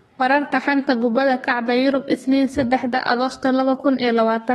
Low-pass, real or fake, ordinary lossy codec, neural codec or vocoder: 14.4 kHz; fake; AAC, 32 kbps; codec, 32 kHz, 1.9 kbps, SNAC